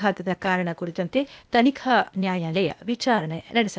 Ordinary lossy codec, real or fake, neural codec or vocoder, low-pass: none; fake; codec, 16 kHz, 0.8 kbps, ZipCodec; none